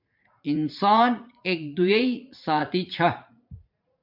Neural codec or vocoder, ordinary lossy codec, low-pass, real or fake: vocoder, 44.1 kHz, 80 mel bands, Vocos; MP3, 48 kbps; 5.4 kHz; fake